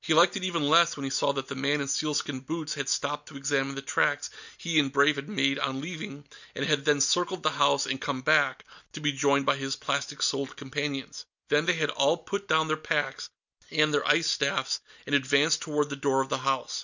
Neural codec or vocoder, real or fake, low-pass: none; real; 7.2 kHz